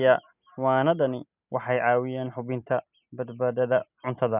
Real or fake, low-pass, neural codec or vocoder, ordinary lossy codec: real; 3.6 kHz; none; none